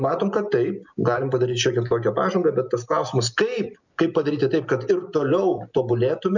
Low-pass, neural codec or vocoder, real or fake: 7.2 kHz; none; real